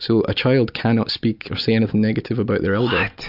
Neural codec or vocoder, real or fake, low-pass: none; real; 5.4 kHz